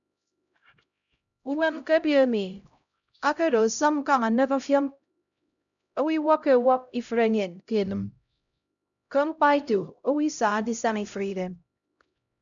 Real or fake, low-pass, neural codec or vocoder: fake; 7.2 kHz; codec, 16 kHz, 0.5 kbps, X-Codec, HuBERT features, trained on LibriSpeech